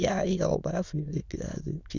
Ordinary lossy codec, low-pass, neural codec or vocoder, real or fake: none; 7.2 kHz; autoencoder, 22.05 kHz, a latent of 192 numbers a frame, VITS, trained on many speakers; fake